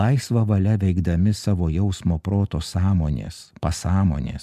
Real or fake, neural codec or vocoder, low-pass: real; none; 14.4 kHz